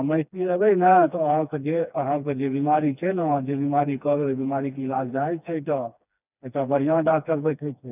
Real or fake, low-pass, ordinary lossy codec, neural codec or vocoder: fake; 3.6 kHz; none; codec, 16 kHz, 2 kbps, FreqCodec, smaller model